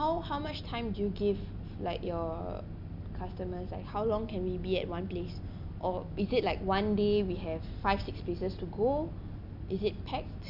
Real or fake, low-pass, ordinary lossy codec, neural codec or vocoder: real; 5.4 kHz; none; none